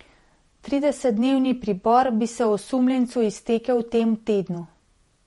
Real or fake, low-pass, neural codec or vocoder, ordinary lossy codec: fake; 19.8 kHz; vocoder, 48 kHz, 128 mel bands, Vocos; MP3, 48 kbps